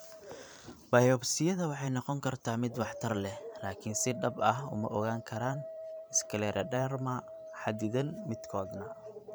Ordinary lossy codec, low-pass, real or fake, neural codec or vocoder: none; none; fake; vocoder, 44.1 kHz, 128 mel bands every 512 samples, BigVGAN v2